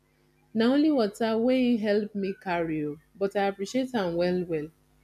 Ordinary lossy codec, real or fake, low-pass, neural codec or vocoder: none; real; 14.4 kHz; none